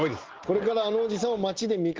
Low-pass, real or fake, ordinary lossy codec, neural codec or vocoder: 7.2 kHz; real; Opus, 16 kbps; none